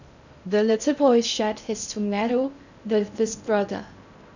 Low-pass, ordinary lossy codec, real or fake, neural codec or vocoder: 7.2 kHz; none; fake; codec, 16 kHz in and 24 kHz out, 0.6 kbps, FocalCodec, streaming, 2048 codes